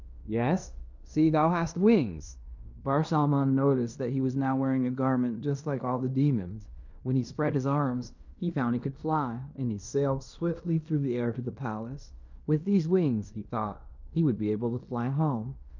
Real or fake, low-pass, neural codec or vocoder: fake; 7.2 kHz; codec, 16 kHz in and 24 kHz out, 0.9 kbps, LongCat-Audio-Codec, fine tuned four codebook decoder